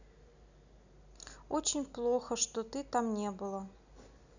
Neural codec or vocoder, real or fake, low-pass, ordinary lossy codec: none; real; 7.2 kHz; none